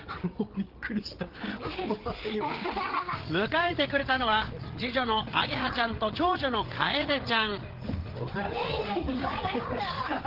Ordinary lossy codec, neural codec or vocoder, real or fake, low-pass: Opus, 16 kbps; codec, 16 kHz, 8 kbps, FreqCodec, larger model; fake; 5.4 kHz